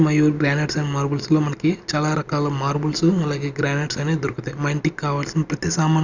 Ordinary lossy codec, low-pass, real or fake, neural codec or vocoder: none; 7.2 kHz; real; none